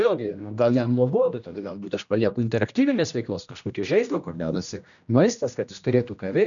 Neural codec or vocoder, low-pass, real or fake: codec, 16 kHz, 1 kbps, X-Codec, HuBERT features, trained on general audio; 7.2 kHz; fake